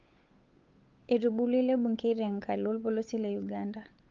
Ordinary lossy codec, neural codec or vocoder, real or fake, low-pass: Opus, 24 kbps; codec, 16 kHz, 8 kbps, FunCodec, trained on Chinese and English, 25 frames a second; fake; 7.2 kHz